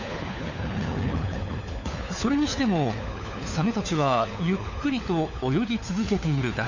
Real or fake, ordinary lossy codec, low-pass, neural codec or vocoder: fake; none; 7.2 kHz; codec, 16 kHz, 4 kbps, FunCodec, trained on LibriTTS, 50 frames a second